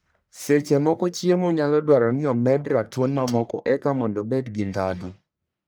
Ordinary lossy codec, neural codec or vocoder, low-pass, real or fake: none; codec, 44.1 kHz, 1.7 kbps, Pupu-Codec; none; fake